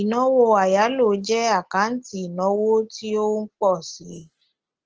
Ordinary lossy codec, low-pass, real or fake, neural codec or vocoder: Opus, 16 kbps; 7.2 kHz; real; none